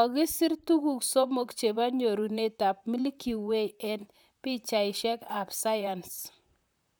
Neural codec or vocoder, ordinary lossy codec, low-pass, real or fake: vocoder, 44.1 kHz, 128 mel bands, Pupu-Vocoder; none; none; fake